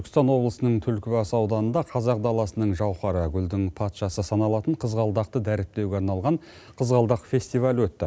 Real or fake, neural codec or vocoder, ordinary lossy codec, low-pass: real; none; none; none